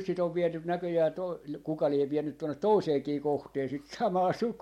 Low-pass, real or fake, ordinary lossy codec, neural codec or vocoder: 19.8 kHz; real; MP3, 64 kbps; none